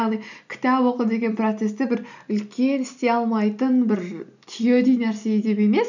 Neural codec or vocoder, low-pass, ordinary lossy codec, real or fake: none; 7.2 kHz; none; real